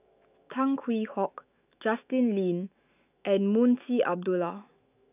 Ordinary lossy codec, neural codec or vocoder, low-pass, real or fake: none; autoencoder, 48 kHz, 128 numbers a frame, DAC-VAE, trained on Japanese speech; 3.6 kHz; fake